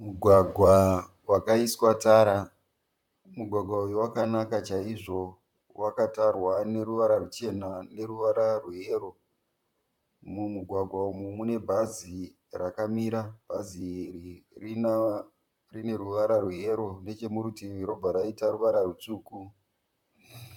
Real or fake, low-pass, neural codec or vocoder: fake; 19.8 kHz; vocoder, 44.1 kHz, 128 mel bands, Pupu-Vocoder